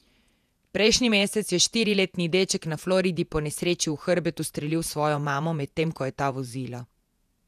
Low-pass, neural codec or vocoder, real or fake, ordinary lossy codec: 14.4 kHz; vocoder, 48 kHz, 128 mel bands, Vocos; fake; none